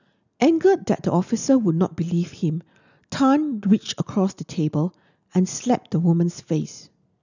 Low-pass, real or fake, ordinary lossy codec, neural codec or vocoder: 7.2 kHz; real; none; none